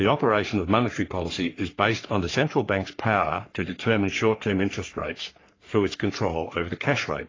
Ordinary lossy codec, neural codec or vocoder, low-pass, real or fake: AAC, 32 kbps; codec, 44.1 kHz, 3.4 kbps, Pupu-Codec; 7.2 kHz; fake